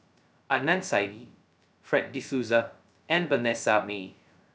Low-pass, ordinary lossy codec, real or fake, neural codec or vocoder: none; none; fake; codec, 16 kHz, 0.2 kbps, FocalCodec